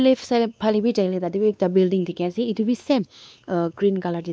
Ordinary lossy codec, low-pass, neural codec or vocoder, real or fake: none; none; codec, 16 kHz, 2 kbps, X-Codec, WavLM features, trained on Multilingual LibriSpeech; fake